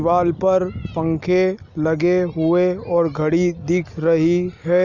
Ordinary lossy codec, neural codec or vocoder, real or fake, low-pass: none; none; real; 7.2 kHz